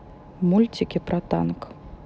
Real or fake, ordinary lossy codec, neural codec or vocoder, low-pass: real; none; none; none